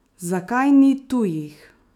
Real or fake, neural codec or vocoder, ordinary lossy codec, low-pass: fake; autoencoder, 48 kHz, 128 numbers a frame, DAC-VAE, trained on Japanese speech; none; 19.8 kHz